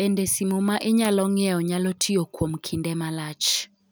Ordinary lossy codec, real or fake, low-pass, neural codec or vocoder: none; real; none; none